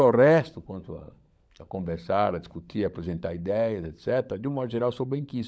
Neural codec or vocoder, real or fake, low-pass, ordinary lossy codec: codec, 16 kHz, 16 kbps, FunCodec, trained on LibriTTS, 50 frames a second; fake; none; none